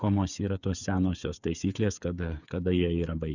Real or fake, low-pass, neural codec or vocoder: fake; 7.2 kHz; codec, 16 kHz, 8 kbps, FreqCodec, smaller model